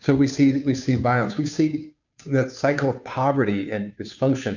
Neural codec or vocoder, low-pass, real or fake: codec, 16 kHz, 2 kbps, FunCodec, trained on Chinese and English, 25 frames a second; 7.2 kHz; fake